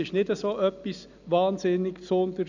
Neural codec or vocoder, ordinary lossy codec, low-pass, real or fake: none; none; 7.2 kHz; real